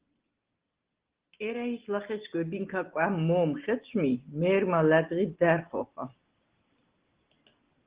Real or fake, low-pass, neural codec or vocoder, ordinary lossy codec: real; 3.6 kHz; none; Opus, 16 kbps